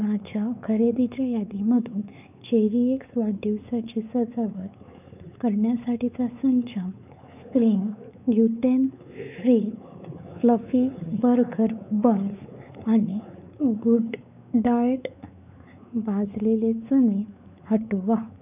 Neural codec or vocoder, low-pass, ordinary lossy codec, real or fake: codec, 16 kHz, 4 kbps, X-Codec, WavLM features, trained on Multilingual LibriSpeech; 3.6 kHz; none; fake